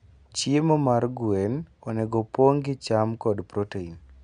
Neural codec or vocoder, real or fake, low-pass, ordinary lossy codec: none; real; 9.9 kHz; none